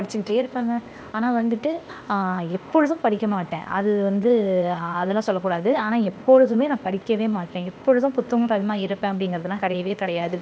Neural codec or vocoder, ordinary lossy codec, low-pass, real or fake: codec, 16 kHz, 0.8 kbps, ZipCodec; none; none; fake